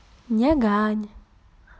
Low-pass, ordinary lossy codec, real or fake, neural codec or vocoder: none; none; real; none